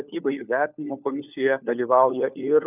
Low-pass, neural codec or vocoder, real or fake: 3.6 kHz; codec, 16 kHz, 4 kbps, FunCodec, trained on LibriTTS, 50 frames a second; fake